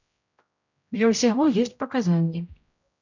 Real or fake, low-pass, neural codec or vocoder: fake; 7.2 kHz; codec, 16 kHz, 0.5 kbps, X-Codec, HuBERT features, trained on general audio